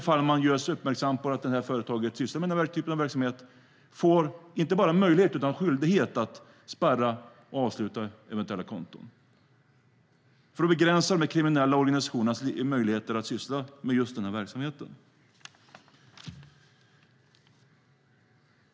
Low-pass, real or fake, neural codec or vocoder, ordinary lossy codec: none; real; none; none